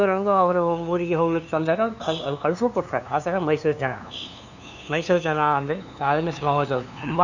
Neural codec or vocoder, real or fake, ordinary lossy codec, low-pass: codec, 16 kHz, 2 kbps, FunCodec, trained on LibriTTS, 25 frames a second; fake; none; 7.2 kHz